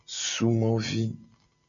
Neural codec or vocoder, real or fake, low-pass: none; real; 7.2 kHz